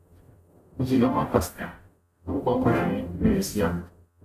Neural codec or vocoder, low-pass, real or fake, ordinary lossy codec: codec, 44.1 kHz, 0.9 kbps, DAC; 14.4 kHz; fake; none